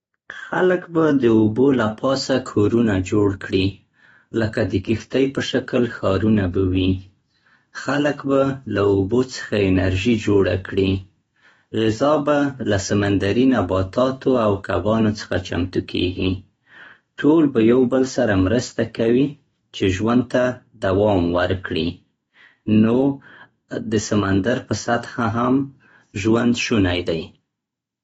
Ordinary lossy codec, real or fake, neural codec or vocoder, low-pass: AAC, 24 kbps; fake; autoencoder, 48 kHz, 128 numbers a frame, DAC-VAE, trained on Japanese speech; 19.8 kHz